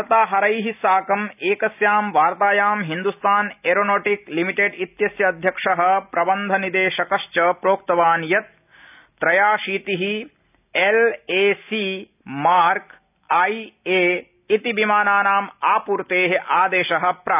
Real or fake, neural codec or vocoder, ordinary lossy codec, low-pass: real; none; none; 3.6 kHz